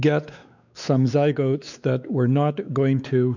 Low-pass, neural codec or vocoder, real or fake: 7.2 kHz; codec, 16 kHz, 4 kbps, X-Codec, WavLM features, trained on Multilingual LibriSpeech; fake